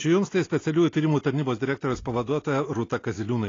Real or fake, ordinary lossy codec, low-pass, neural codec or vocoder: real; AAC, 32 kbps; 7.2 kHz; none